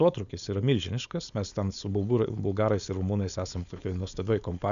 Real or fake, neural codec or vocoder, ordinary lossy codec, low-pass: fake; codec, 16 kHz, 4.8 kbps, FACodec; MP3, 96 kbps; 7.2 kHz